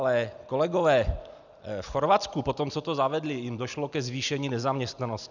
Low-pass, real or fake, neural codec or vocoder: 7.2 kHz; real; none